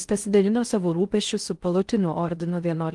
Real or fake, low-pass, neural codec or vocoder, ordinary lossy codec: fake; 10.8 kHz; codec, 16 kHz in and 24 kHz out, 0.6 kbps, FocalCodec, streaming, 4096 codes; Opus, 24 kbps